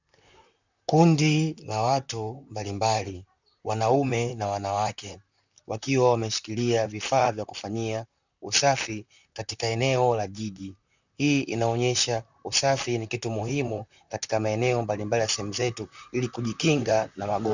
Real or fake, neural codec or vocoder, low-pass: fake; vocoder, 44.1 kHz, 128 mel bands, Pupu-Vocoder; 7.2 kHz